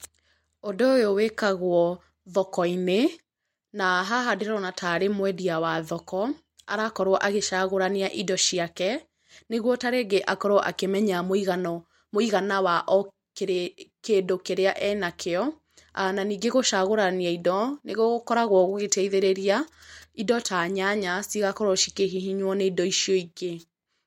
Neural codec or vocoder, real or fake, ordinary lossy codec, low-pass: none; real; MP3, 64 kbps; 19.8 kHz